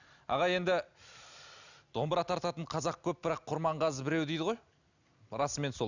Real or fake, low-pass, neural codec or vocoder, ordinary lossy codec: real; 7.2 kHz; none; none